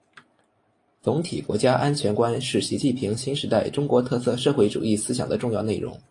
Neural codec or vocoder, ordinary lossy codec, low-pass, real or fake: none; AAC, 48 kbps; 10.8 kHz; real